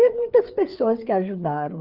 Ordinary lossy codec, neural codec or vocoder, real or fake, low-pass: Opus, 24 kbps; codec, 16 kHz, 4 kbps, FreqCodec, larger model; fake; 5.4 kHz